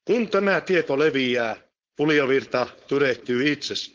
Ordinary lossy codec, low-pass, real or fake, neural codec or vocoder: Opus, 16 kbps; 7.2 kHz; fake; codec, 16 kHz, 4.8 kbps, FACodec